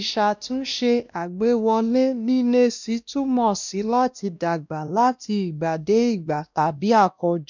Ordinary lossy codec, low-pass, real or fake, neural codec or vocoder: none; 7.2 kHz; fake; codec, 16 kHz, 1 kbps, X-Codec, WavLM features, trained on Multilingual LibriSpeech